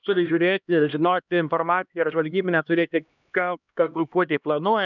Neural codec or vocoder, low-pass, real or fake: codec, 16 kHz, 1 kbps, X-Codec, HuBERT features, trained on LibriSpeech; 7.2 kHz; fake